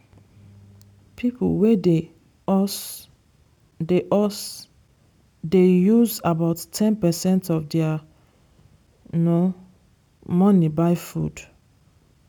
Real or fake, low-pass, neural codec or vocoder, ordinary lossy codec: real; 19.8 kHz; none; none